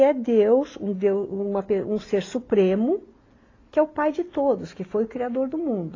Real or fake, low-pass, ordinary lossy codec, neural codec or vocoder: real; 7.2 kHz; AAC, 32 kbps; none